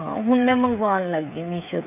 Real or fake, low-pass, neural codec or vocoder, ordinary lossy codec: fake; 3.6 kHz; codec, 16 kHz, 8 kbps, FreqCodec, smaller model; none